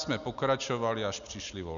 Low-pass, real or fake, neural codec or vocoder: 7.2 kHz; real; none